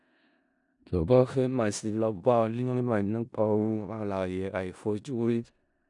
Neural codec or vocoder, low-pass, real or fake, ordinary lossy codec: codec, 16 kHz in and 24 kHz out, 0.4 kbps, LongCat-Audio-Codec, four codebook decoder; 10.8 kHz; fake; none